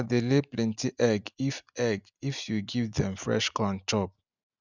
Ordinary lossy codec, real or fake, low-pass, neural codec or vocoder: none; real; 7.2 kHz; none